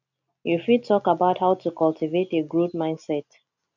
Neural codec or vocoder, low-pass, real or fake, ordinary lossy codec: none; 7.2 kHz; real; none